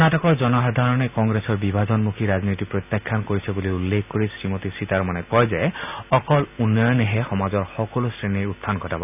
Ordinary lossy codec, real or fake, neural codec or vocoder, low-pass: none; real; none; 3.6 kHz